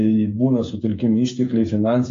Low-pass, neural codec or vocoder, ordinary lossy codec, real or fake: 7.2 kHz; codec, 16 kHz, 8 kbps, FreqCodec, smaller model; AAC, 48 kbps; fake